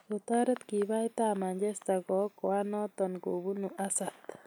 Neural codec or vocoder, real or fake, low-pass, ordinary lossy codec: none; real; none; none